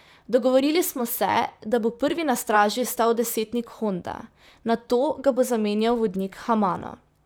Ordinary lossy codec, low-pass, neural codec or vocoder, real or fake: none; none; vocoder, 44.1 kHz, 128 mel bands, Pupu-Vocoder; fake